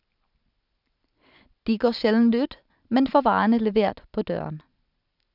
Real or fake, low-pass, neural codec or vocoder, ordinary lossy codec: real; 5.4 kHz; none; none